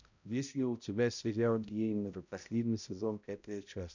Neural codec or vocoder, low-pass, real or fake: codec, 16 kHz, 0.5 kbps, X-Codec, HuBERT features, trained on balanced general audio; 7.2 kHz; fake